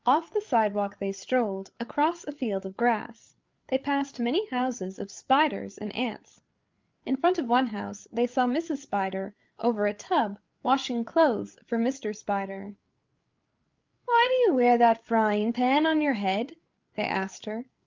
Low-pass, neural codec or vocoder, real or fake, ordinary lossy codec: 7.2 kHz; codec, 16 kHz, 8 kbps, FreqCodec, larger model; fake; Opus, 24 kbps